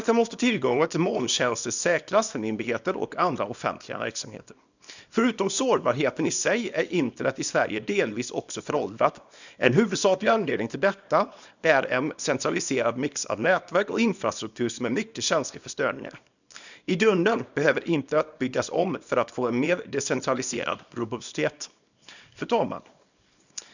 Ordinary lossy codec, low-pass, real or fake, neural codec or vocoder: none; 7.2 kHz; fake; codec, 24 kHz, 0.9 kbps, WavTokenizer, small release